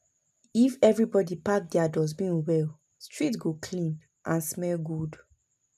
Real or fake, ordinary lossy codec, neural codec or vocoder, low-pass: real; MP3, 96 kbps; none; 14.4 kHz